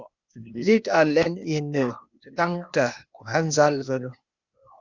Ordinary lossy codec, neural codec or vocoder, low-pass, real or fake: Opus, 64 kbps; codec, 16 kHz, 0.8 kbps, ZipCodec; 7.2 kHz; fake